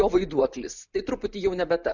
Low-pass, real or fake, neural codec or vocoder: 7.2 kHz; real; none